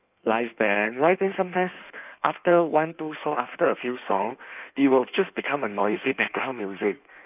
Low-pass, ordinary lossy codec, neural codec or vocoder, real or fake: 3.6 kHz; none; codec, 16 kHz in and 24 kHz out, 1.1 kbps, FireRedTTS-2 codec; fake